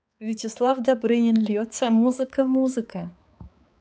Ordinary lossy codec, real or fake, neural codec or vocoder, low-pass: none; fake; codec, 16 kHz, 4 kbps, X-Codec, HuBERT features, trained on balanced general audio; none